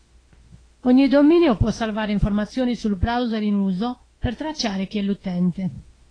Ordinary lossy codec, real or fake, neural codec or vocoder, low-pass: AAC, 32 kbps; fake; autoencoder, 48 kHz, 32 numbers a frame, DAC-VAE, trained on Japanese speech; 9.9 kHz